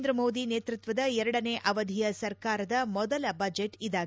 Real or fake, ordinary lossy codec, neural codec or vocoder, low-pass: real; none; none; none